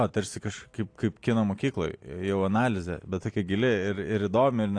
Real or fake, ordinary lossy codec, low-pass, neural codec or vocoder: real; AAC, 48 kbps; 9.9 kHz; none